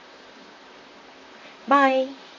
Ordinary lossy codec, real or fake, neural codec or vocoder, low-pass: MP3, 32 kbps; fake; vocoder, 44.1 kHz, 128 mel bands, Pupu-Vocoder; 7.2 kHz